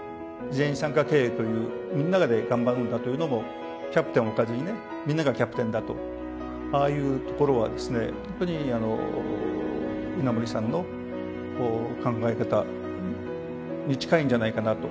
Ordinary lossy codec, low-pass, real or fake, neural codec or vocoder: none; none; real; none